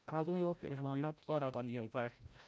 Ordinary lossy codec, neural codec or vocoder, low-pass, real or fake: none; codec, 16 kHz, 0.5 kbps, FreqCodec, larger model; none; fake